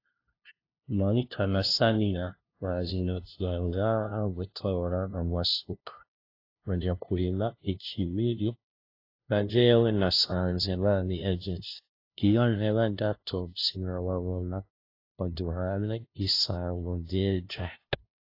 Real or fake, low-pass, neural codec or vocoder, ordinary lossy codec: fake; 5.4 kHz; codec, 16 kHz, 0.5 kbps, FunCodec, trained on LibriTTS, 25 frames a second; AAC, 32 kbps